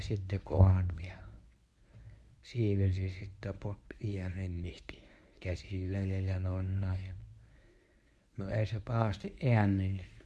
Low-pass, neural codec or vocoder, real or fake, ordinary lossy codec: none; codec, 24 kHz, 0.9 kbps, WavTokenizer, medium speech release version 1; fake; none